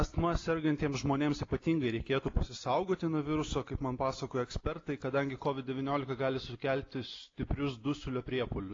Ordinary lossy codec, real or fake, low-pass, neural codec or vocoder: AAC, 32 kbps; real; 7.2 kHz; none